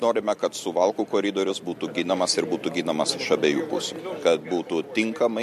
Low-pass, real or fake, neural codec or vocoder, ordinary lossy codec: 14.4 kHz; real; none; MP3, 64 kbps